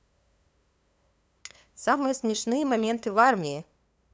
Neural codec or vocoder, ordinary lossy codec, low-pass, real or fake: codec, 16 kHz, 2 kbps, FunCodec, trained on LibriTTS, 25 frames a second; none; none; fake